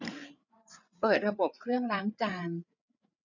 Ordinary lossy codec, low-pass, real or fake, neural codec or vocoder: none; 7.2 kHz; fake; codec, 16 kHz, 8 kbps, FreqCodec, larger model